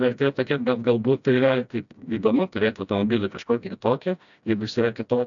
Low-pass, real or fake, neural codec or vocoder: 7.2 kHz; fake; codec, 16 kHz, 1 kbps, FreqCodec, smaller model